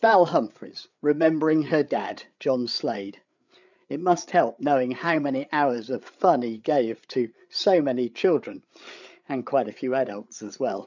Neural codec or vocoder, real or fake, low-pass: codec, 16 kHz, 8 kbps, FreqCodec, larger model; fake; 7.2 kHz